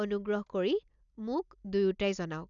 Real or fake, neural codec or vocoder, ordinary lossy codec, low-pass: real; none; none; 7.2 kHz